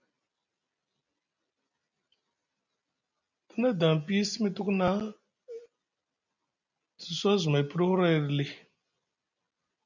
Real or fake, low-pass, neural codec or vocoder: real; 7.2 kHz; none